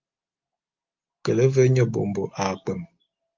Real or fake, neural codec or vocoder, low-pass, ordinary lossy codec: real; none; 7.2 kHz; Opus, 32 kbps